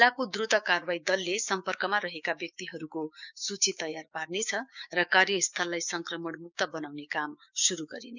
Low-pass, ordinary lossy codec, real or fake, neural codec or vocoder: 7.2 kHz; none; fake; codec, 16 kHz, 6 kbps, DAC